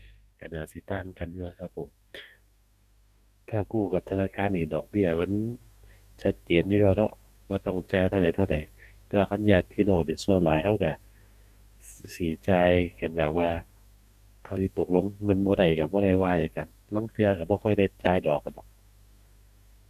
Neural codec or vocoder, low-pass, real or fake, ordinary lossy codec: codec, 44.1 kHz, 2.6 kbps, DAC; 14.4 kHz; fake; none